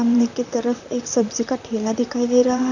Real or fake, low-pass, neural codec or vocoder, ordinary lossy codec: fake; 7.2 kHz; vocoder, 44.1 kHz, 128 mel bands, Pupu-Vocoder; none